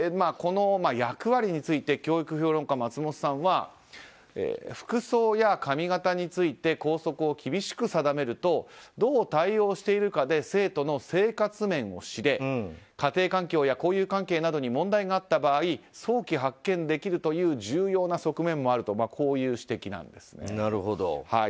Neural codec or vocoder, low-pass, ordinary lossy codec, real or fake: none; none; none; real